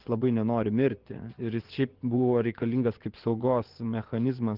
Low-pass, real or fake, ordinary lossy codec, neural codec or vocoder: 5.4 kHz; fake; Opus, 16 kbps; vocoder, 24 kHz, 100 mel bands, Vocos